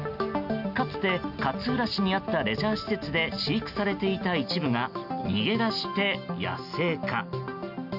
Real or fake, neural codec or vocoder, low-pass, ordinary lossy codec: real; none; 5.4 kHz; none